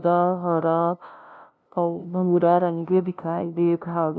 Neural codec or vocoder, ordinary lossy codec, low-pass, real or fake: codec, 16 kHz, 0.5 kbps, FunCodec, trained on LibriTTS, 25 frames a second; none; none; fake